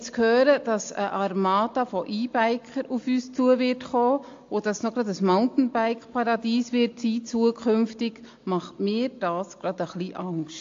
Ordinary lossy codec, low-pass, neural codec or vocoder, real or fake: AAC, 48 kbps; 7.2 kHz; none; real